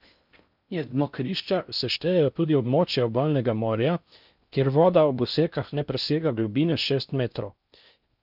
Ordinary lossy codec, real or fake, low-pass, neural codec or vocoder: none; fake; 5.4 kHz; codec, 16 kHz in and 24 kHz out, 0.6 kbps, FocalCodec, streaming, 4096 codes